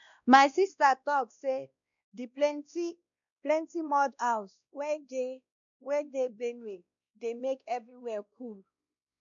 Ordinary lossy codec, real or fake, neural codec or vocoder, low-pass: none; fake; codec, 16 kHz, 1 kbps, X-Codec, WavLM features, trained on Multilingual LibriSpeech; 7.2 kHz